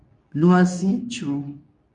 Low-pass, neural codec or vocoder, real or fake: 10.8 kHz; codec, 24 kHz, 0.9 kbps, WavTokenizer, medium speech release version 2; fake